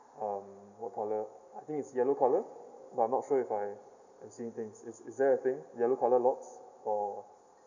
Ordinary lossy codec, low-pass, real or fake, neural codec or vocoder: none; 7.2 kHz; real; none